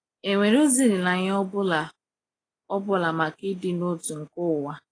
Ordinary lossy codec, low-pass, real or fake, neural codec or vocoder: AAC, 32 kbps; 9.9 kHz; real; none